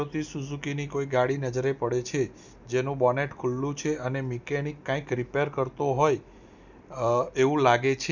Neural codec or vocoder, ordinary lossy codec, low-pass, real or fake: none; none; 7.2 kHz; real